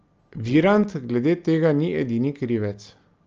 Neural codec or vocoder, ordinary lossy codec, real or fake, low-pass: none; Opus, 24 kbps; real; 7.2 kHz